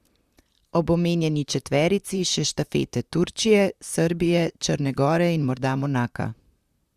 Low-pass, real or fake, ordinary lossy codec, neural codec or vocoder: 14.4 kHz; fake; Opus, 64 kbps; vocoder, 44.1 kHz, 128 mel bands, Pupu-Vocoder